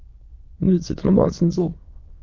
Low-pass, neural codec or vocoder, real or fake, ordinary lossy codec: 7.2 kHz; autoencoder, 22.05 kHz, a latent of 192 numbers a frame, VITS, trained on many speakers; fake; Opus, 24 kbps